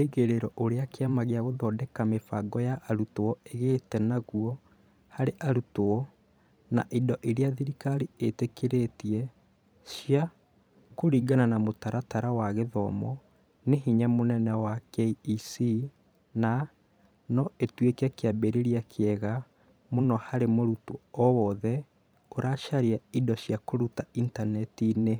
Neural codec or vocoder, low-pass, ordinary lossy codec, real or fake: vocoder, 44.1 kHz, 128 mel bands every 512 samples, BigVGAN v2; none; none; fake